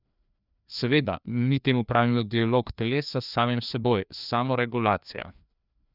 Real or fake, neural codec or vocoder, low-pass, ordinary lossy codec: fake; codec, 16 kHz, 2 kbps, FreqCodec, larger model; 5.4 kHz; none